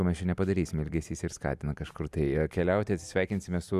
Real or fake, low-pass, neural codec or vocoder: real; 14.4 kHz; none